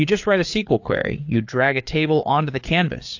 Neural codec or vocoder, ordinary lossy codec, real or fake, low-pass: codec, 16 kHz, 4 kbps, FreqCodec, larger model; AAC, 48 kbps; fake; 7.2 kHz